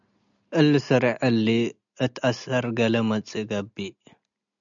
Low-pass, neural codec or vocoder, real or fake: 7.2 kHz; none; real